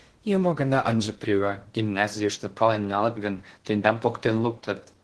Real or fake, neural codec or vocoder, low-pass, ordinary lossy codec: fake; codec, 16 kHz in and 24 kHz out, 0.6 kbps, FocalCodec, streaming, 2048 codes; 10.8 kHz; Opus, 16 kbps